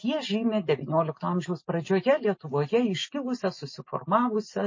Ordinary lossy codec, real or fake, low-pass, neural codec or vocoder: MP3, 32 kbps; real; 7.2 kHz; none